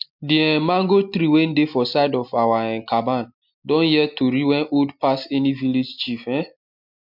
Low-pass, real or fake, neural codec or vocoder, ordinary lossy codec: 5.4 kHz; real; none; MP3, 48 kbps